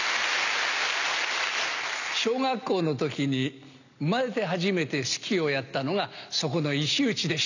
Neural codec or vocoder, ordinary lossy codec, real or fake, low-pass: none; none; real; 7.2 kHz